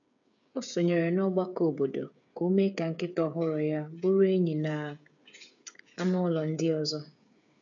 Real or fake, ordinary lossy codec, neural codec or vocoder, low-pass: fake; none; codec, 16 kHz, 8 kbps, FreqCodec, smaller model; 7.2 kHz